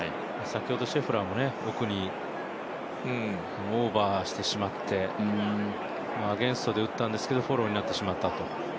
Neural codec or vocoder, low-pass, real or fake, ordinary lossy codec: none; none; real; none